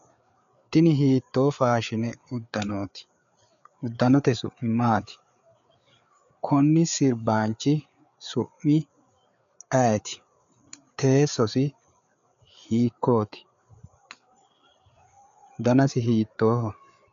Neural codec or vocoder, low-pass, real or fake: codec, 16 kHz, 4 kbps, FreqCodec, larger model; 7.2 kHz; fake